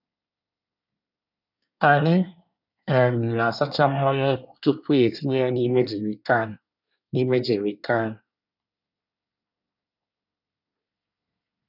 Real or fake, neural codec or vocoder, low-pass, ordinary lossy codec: fake; codec, 24 kHz, 1 kbps, SNAC; 5.4 kHz; none